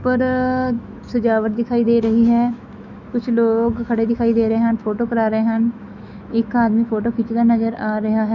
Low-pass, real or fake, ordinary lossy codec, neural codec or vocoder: 7.2 kHz; fake; none; autoencoder, 48 kHz, 128 numbers a frame, DAC-VAE, trained on Japanese speech